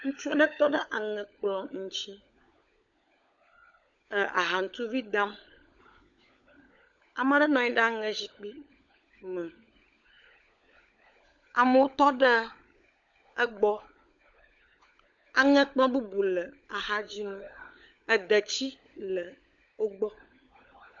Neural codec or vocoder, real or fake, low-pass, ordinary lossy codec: codec, 16 kHz, 16 kbps, FunCodec, trained on LibriTTS, 50 frames a second; fake; 7.2 kHz; AAC, 64 kbps